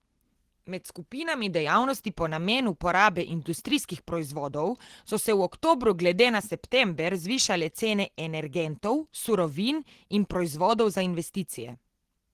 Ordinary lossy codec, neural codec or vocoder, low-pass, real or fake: Opus, 16 kbps; none; 14.4 kHz; real